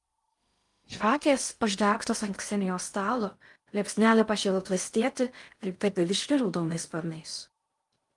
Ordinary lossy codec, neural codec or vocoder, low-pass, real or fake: Opus, 32 kbps; codec, 16 kHz in and 24 kHz out, 0.6 kbps, FocalCodec, streaming, 2048 codes; 10.8 kHz; fake